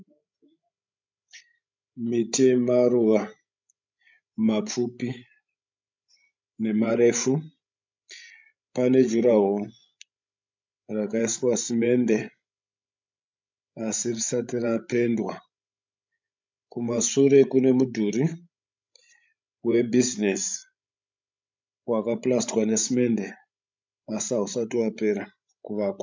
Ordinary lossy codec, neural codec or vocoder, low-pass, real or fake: MP3, 64 kbps; codec, 16 kHz, 16 kbps, FreqCodec, larger model; 7.2 kHz; fake